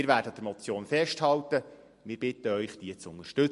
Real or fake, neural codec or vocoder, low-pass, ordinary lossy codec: real; none; 14.4 kHz; MP3, 48 kbps